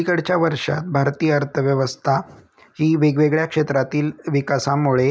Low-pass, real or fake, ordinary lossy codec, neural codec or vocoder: none; real; none; none